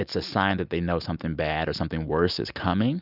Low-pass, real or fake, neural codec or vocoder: 5.4 kHz; real; none